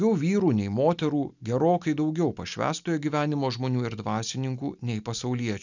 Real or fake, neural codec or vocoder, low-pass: real; none; 7.2 kHz